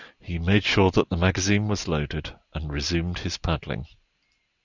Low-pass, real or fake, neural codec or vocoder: 7.2 kHz; real; none